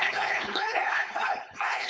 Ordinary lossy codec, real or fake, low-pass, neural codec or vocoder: none; fake; none; codec, 16 kHz, 4.8 kbps, FACodec